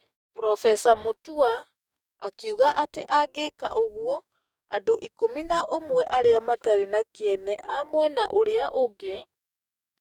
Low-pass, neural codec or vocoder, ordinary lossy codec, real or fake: 19.8 kHz; codec, 44.1 kHz, 2.6 kbps, DAC; none; fake